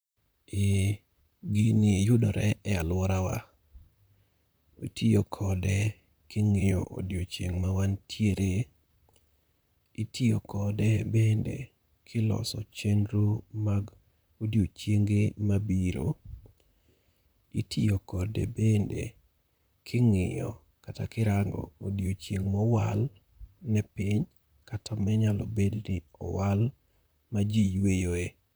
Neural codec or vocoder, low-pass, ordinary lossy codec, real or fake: vocoder, 44.1 kHz, 128 mel bands, Pupu-Vocoder; none; none; fake